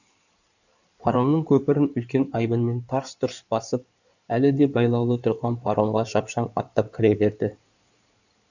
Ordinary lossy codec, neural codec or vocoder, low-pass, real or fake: none; codec, 16 kHz in and 24 kHz out, 2.2 kbps, FireRedTTS-2 codec; 7.2 kHz; fake